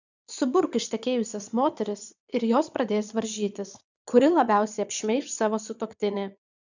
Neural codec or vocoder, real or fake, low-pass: vocoder, 22.05 kHz, 80 mel bands, Vocos; fake; 7.2 kHz